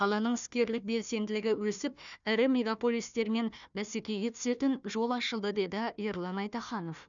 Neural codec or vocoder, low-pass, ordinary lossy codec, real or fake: codec, 16 kHz, 1 kbps, FunCodec, trained on Chinese and English, 50 frames a second; 7.2 kHz; none; fake